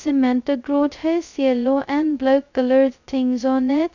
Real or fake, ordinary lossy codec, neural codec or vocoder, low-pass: fake; none; codec, 16 kHz, 0.2 kbps, FocalCodec; 7.2 kHz